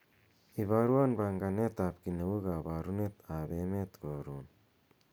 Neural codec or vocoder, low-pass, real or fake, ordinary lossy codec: none; none; real; none